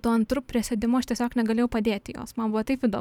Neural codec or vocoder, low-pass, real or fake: vocoder, 44.1 kHz, 128 mel bands every 512 samples, BigVGAN v2; 19.8 kHz; fake